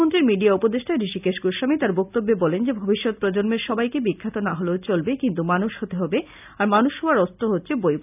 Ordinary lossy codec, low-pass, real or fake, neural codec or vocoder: none; 3.6 kHz; real; none